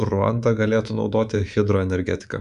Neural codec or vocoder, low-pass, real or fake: codec, 24 kHz, 3.1 kbps, DualCodec; 10.8 kHz; fake